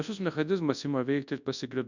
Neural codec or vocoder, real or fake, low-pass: codec, 24 kHz, 0.9 kbps, WavTokenizer, large speech release; fake; 7.2 kHz